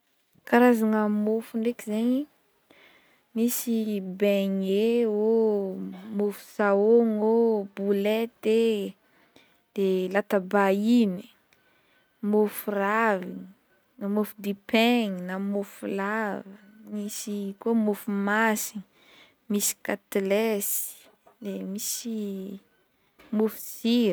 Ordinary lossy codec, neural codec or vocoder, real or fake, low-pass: none; none; real; none